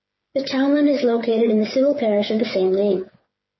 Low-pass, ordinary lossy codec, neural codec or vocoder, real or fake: 7.2 kHz; MP3, 24 kbps; codec, 16 kHz, 8 kbps, FreqCodec, smaller model; fake